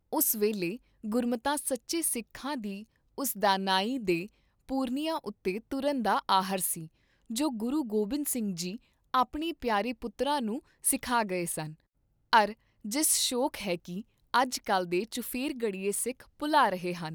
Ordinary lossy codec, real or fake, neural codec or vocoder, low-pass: none; real; none; none